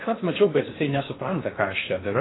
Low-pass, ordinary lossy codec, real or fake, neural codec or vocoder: 7.2 kHz; AAC, 16 kbps; fake; codec, 16 kHz in and 24 kHz out, 0.6 kbps, FocalCodec, streaming, 4096 codes